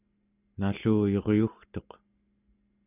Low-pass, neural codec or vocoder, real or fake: 3.6 kHz; none; real